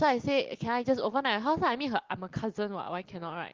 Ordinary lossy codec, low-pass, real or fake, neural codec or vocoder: Opus, 16 kbps; 7.2 kHz; real; none